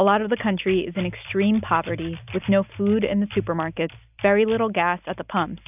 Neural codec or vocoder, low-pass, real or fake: none; 3.6 kHz; real